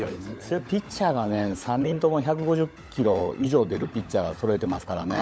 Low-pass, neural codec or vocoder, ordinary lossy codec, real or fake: none; codec, 16 kHz, 16 kbps, FunCodec, trained on LibriTTS, 50 frames a second; none; fake